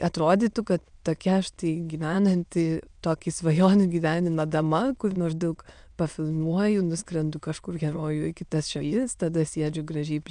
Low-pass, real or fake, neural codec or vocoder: 9.9 kHz; fake; autoencoder, 22.05 kHz, a latent of 192 numbers a frame, VITS, trained on many speakers